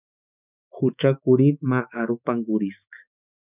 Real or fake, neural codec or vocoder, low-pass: fake; autoencoder, 48 kHz, 128 numbers a frame, DAC-VAE, trained on Japanese speech; 3.6 kHz